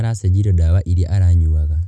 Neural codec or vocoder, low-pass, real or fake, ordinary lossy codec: none; none; real; none